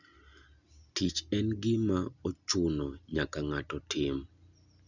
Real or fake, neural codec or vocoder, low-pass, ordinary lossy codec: real; none; 7.2 kHz; none